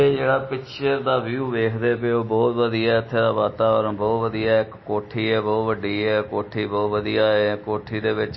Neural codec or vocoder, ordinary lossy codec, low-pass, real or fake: none; MP3, 24 kbps; 7.2 kHz; real